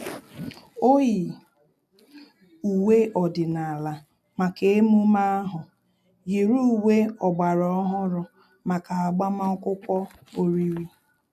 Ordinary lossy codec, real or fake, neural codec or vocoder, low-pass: none; real; none; 14.4 kHz